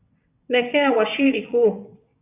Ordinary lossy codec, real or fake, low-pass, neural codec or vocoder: AAC, 24 kbps; fake; 3.6 kHz; vocoder, 22.05 kHz, 80 mel bands, WaveNeXt